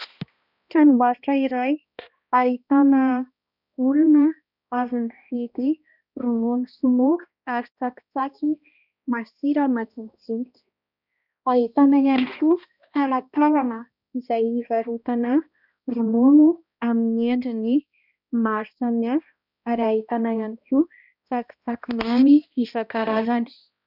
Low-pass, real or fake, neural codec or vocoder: 5.4 kHz; fake; codec, 16 kHz, 1 kbps, X-Codec, HuBERT features, trained on balanced general audio